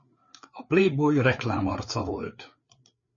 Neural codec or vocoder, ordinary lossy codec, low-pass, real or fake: codec, 16 kHz, 4 kbps, FreqCodec, larger model; MP3, 32 kbps; 7.2 kHz; fake